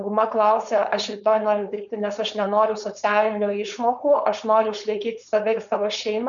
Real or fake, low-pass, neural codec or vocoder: fake; 7.2 kHz; codec, 16 kHz, 4.8 kbps, FACodec